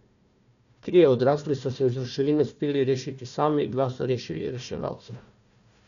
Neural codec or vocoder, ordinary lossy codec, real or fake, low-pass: codec, 16 kHz, 1 kbps, FunCodec, trained on Chinese and English, 50 frames a second; none; fake; 7.2 kHz